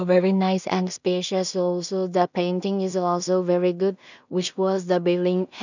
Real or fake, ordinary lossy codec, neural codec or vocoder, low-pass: fake; none; codec, 16 kHz in and 24 kHz out, 0.4 kbps, LongCat-Audio-Codec, two codebook decoder; 7.2 kHz